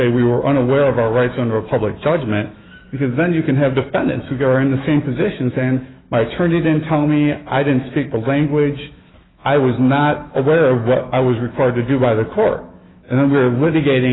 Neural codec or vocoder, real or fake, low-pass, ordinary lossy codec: autoencoder, 48 kHz, 128 numbers a frame, DAC-VAE, trained on Japanese speech; fake; 7.2 kHz; AAC, 16 kbps